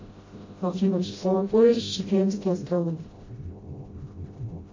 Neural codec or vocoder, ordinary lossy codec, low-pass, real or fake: codec, 16 kHz, 0.5 kbps, FreqCodec, smaller model; MP3, 32 kbps; 7.2 kHz; fake